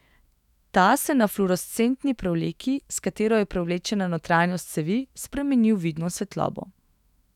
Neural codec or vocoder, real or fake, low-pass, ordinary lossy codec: autoencoder, 48 kHz, 128 numbers a frame, DAC-VAE, trained on Japanese speech; fake; 19.8 kHz; none